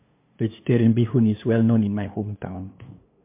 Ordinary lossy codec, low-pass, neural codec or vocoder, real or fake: MP3, 24 kbps; 3.6 kHz; codec, 16 kHz, 2 kbps, FunCodec, trained on LibriTTS, 25 frames a second; fake